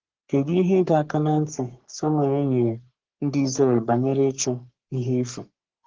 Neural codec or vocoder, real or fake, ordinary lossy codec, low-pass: codec, 44.1 kHz, 3.4 kbps, Pupu-Codec; fake; Opus, 16 kbps; 7.2 kHz